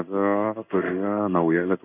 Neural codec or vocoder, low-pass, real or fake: codec, 16 kHz in and 24 kHz out, 1 kbps, XY-Tokenizer; 3.6 kHz; fake